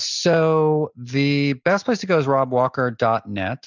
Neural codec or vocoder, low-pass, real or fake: none; 7.2 kHz; real